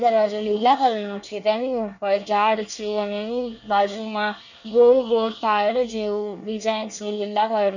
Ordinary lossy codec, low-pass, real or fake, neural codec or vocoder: none; 7.2 kHz; fake; codec, 24 kHz, 1 kbps, SNAC